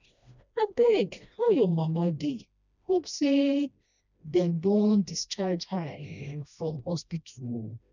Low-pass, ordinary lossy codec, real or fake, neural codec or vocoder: 7.2 kHz; none; fake; codec, 16 kHz, 1 kbps, FreqCodec, smaller model